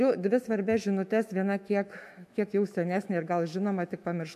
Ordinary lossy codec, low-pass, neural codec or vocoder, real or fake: MP3, 64 kbps; 14.4 kHz; autoencoder, 48 kHz, 128 numbers a frame, DAC-VAE, trained on Japanese speech; fake